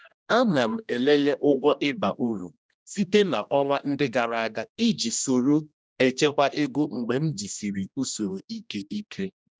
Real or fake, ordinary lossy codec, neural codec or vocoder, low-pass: fake; none; codec, 16 kHz, 1 kbps, X-Codec, HuBERT features, trained on general audio; none